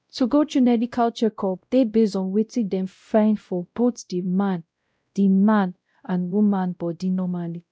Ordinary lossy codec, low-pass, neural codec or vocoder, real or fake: none; none; codec, 16 kHz, 0.5 kbps, X-Codec, WavLM features, trained on Multilingual LibriSpeech; fake